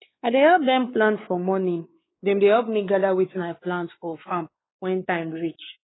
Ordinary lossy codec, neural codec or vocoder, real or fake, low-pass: AAC, 16 kbps; codec, 16 kHz, 4 kbps, X-Codec, WavLM features, trained on Multilingual LibriSpeech; fake; 7.2 kHz